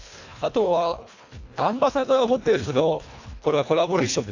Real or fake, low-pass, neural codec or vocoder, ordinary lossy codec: fake; 7.2 kHz; codec, 24 kHz, 1.5 kbps, HILCodec; AAC, 48 kbps